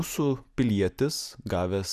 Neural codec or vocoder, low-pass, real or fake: none; 14.4 kHz; real